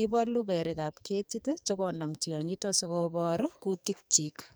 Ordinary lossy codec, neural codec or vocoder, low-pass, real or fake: none; codec, 44.1 kHz, 2.6 kbps, SNAC; none; fake